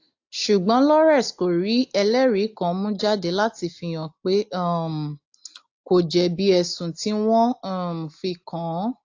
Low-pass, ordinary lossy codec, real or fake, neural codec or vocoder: 7.2 kHz; AAC, 48 kbps; real; none